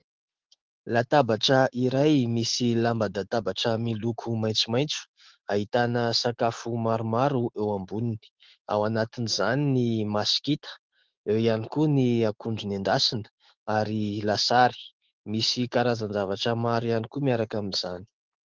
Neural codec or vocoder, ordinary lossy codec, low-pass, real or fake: autoencoder, 48 kHz, 128 numbers a frame, DAC-VAE, trained on Japanese speech; Opus, 16 kbps; 7.2 kHz; fake